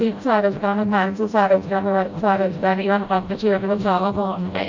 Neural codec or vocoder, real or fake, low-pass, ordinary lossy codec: codec, 16 kHz, 0.5 kbps, FreqCodec, smaller model; fake; 7.2 kHz; MP3, 64 kbps